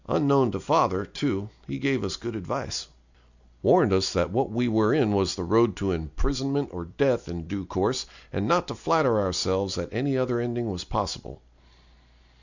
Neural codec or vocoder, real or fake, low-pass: none; real; 7.2 kHz